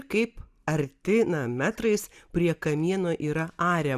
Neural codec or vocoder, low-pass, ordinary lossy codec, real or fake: none; 14.4 kHz; Opus, 64 kbps; real